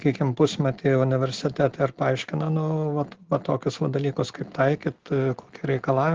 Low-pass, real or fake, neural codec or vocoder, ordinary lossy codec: 7.2 kHz; real; none; Opus, 16 kbps